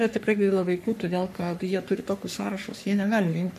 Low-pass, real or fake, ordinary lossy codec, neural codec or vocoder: 14.4 kHz; fake; MP3, 96 kbps; codec, 44.1 kHz, 3.4 kbps, Pupu-Codec